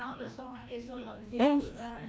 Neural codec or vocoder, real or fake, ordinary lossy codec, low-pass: codec, 16 kHz, 1 kbps, FreqCodec, larger model; fake; none; none